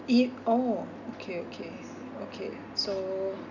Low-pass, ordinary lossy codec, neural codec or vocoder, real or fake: 7.2 kHz; none; none; real